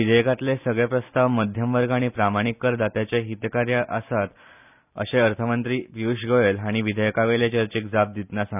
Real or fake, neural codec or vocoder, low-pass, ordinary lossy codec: real; none; 3.6 kHz; none